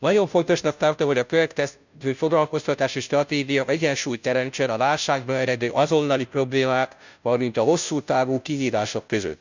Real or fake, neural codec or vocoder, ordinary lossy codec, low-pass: fake; codec, 16 kHz, 0.5 kbps, FunCodec, trained on Chinese and English, 25 frames a second; none; 7.2 kHz